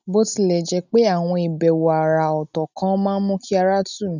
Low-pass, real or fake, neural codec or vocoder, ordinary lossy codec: 7.2 kHz; real; none; none